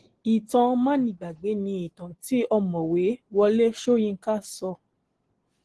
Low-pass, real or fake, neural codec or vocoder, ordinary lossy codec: 10.8 kHz; real; none; Opus, 16 kbps